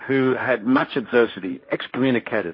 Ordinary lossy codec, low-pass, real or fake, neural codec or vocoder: MP3, 24 kbps; 5.4 kHz; fake; codec, 16 kHz, 1.1 kbps, Voila-Tokenizer